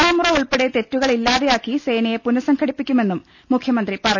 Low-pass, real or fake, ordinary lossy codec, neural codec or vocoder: 7.2 kHz; real; none; none